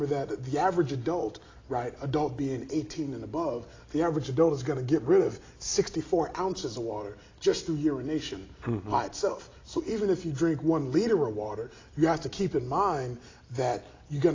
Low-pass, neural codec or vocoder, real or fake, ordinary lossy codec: 7.2 kHz; none; real; AAC, 32 kbps